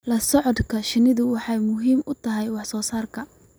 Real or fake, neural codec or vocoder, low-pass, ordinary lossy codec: real; none; none; none